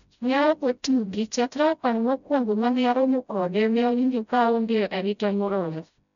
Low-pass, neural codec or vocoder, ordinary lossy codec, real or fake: 7.2 kHz; codec, 16 kHz, 0.5 kbps, FreqCodec, smaller model; none; fake